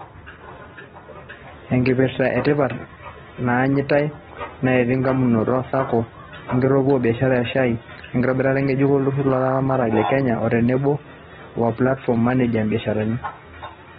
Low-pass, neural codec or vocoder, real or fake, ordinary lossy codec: 19.8 kHz; none; real; AAC, 16 kbps